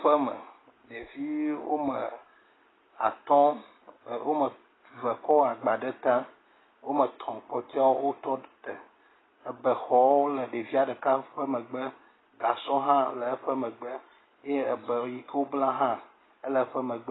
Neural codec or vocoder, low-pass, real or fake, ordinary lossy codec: none; 7.2 kHz; real; AAC, 16 kbps